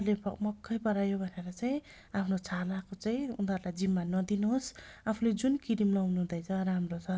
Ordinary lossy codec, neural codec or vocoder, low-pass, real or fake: none; none; none; real